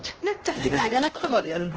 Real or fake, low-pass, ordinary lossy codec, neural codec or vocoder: fake; 7.2 kHz; Opus, 16 kbps; codec, 16 kHz, 1 kbps, X-Codec, HuBERT features, trained on LibriSpeech